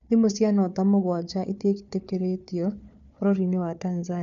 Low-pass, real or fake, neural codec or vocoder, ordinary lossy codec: 7.2 kHz; fake; codec, 16 kHz, 4 kbps, FunCodec, trained on Chinese and English, 50 frames a second; none